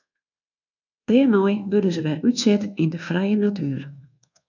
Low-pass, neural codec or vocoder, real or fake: 7.2 kHz; codec, 24 kHz, 1.2 kbps, DualCodec; fake